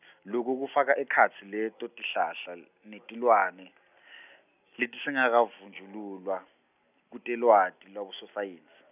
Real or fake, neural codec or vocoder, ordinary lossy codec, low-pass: real; none; none; 3.6 kHz